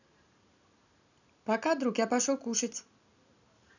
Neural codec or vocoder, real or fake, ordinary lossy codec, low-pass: none; real; none; 7.2 kHz